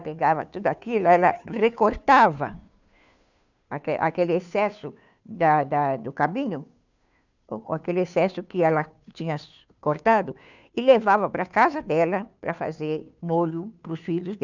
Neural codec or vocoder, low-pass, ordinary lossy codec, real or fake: codec, 16 kHz, 2 kbps, FunCodec, trained on Chinese and English, 25 frames a second; 7.2 kHz; none; fake